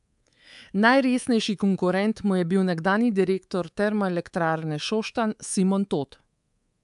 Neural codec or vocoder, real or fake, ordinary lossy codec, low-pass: codec, 24 kHz, 3.1 kbps, DualCodec; fake; AAC, 96 kbps; 10.8 kHz